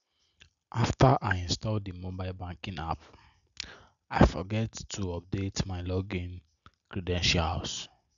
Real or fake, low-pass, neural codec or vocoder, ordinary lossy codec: real; 7.2 kHz; none; none